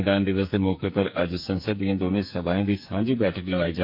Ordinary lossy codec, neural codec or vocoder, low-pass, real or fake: none; codec, 44.1 kHz, 2.6 kbps, SNAC; 5.4 kHz; fake